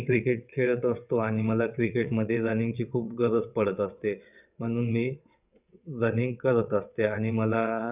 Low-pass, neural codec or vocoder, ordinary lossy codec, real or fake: 3.6 kHz; vocoder, 22.05 kHz, 80 mel bands, WaveNeXt; none; fake